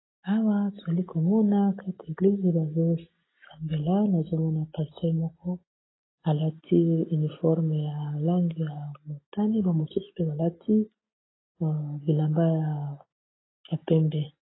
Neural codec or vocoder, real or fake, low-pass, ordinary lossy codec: none; real; 7.2 kHz; AAC, 16 kbps